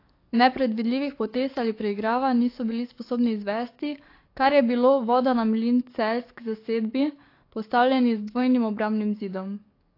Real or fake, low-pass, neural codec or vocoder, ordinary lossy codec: fake; 5.4 kHz; codec, 44.1 kHz, 7.8 kbps, DAC; AAC, 32 kbps